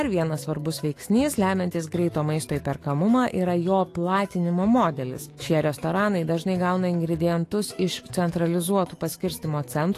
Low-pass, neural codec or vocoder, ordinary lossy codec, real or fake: 14.4 kHz; autoencoder, 48 kHz, 128 numbers a frame, DAC-VAE, trained on Japanese speech; AAC, 48 kbps; fake